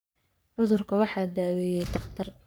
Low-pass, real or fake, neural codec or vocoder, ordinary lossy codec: none; fake; codec, 44.1 kHz, 3.4 kbps, Pupu-Codec; none